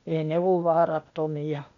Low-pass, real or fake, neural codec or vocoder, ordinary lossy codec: 7.2 kHz; fake; codec, 16 kHz, 0.8 kbps, ZipCodec; none